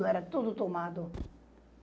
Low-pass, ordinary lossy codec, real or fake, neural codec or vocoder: none; none; real; none